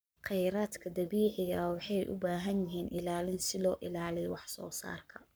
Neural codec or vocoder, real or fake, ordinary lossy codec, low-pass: codec, 44.1 kHz, 7.8 kbps, Pupu-Codec; fake; none; none